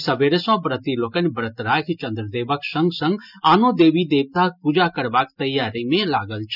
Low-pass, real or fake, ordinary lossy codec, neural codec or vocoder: 5.4 kHz; real; none; none